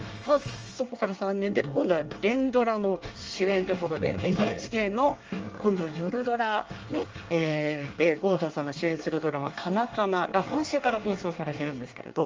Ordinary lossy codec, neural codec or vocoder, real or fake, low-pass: Opus, 24 kbps; codec, 24 kHz, 1 kbps, SNAC; fake; 7.2 kHz